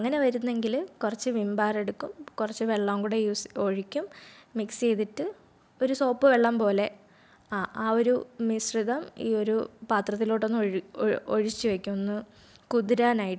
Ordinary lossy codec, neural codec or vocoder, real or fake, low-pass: none; none; real; none